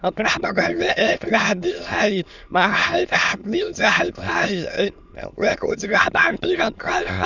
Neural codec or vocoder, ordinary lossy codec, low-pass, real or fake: autoencoder, 22.05 kHz, a latent of 192 numbers a frame, VITS, trained on many speakers; none; 7.2 kHz; fake